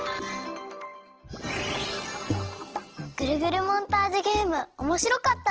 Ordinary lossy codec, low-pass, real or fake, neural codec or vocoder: Opus, 16 kbps; 7.2 kHz; real; none